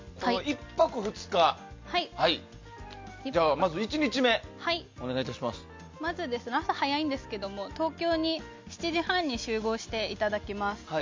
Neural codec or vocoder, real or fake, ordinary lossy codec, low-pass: none; real; MP3, 64 kbps; 7.2 kHz